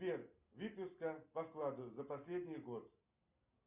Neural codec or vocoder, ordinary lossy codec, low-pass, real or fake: none; Opus, 64 kbps; 3.6 kHz; real